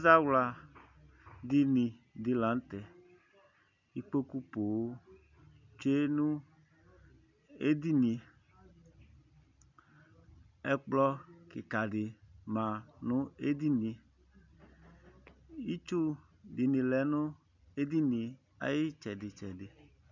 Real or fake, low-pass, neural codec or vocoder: real; 7.2 kHz; none